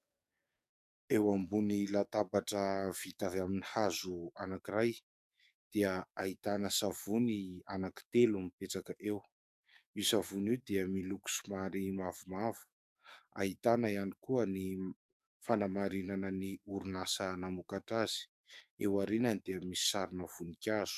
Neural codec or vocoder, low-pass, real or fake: codec, 44.1 kHz, 7.8 kbps, DAC; 14.4 kHz; fake